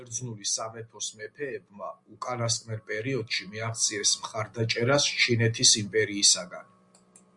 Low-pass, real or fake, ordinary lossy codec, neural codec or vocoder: 10.8 kHz; real; Opus, 64 kbps; none